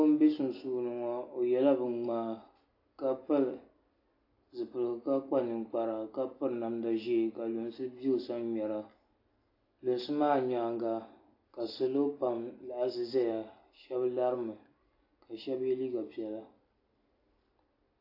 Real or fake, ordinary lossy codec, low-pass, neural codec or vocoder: real; AAC, 32 kbps; 5.4 kHz; none